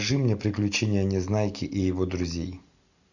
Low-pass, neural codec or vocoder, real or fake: 7.2 kHz; none; real